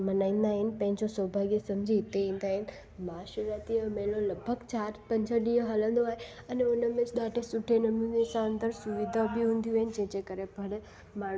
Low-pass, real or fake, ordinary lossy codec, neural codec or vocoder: none; real; none; none